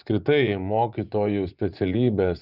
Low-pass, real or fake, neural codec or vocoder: 5.4 kHz; fake; vocoder, 24 kHz, 100 mel bands, Vocos